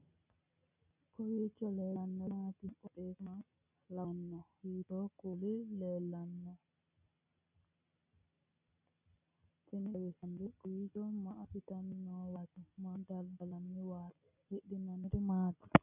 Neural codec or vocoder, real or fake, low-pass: none; real; 3.6 kHz